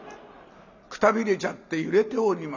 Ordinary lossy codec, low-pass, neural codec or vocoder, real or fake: none; 7.2 kHz; none; real